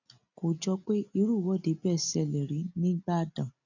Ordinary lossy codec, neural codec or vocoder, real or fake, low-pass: none; none; real; 7.2 kHz